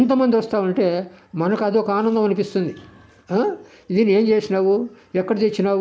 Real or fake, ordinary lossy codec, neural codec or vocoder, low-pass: real; none; none; none